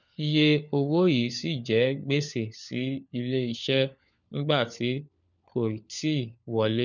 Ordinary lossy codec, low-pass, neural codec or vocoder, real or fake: none; 7.2 kHz; codec, 16 kHz, 4 kbps, FunCodec, trained on LibriTTS, 50 frames a second; fake